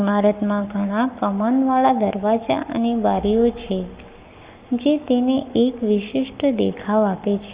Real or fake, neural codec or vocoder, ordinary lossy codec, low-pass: fake; codec, 44.1 kHz, 7.8 kbps, Pupu-Codec; Opus, 64 kbps; 3.6 kHz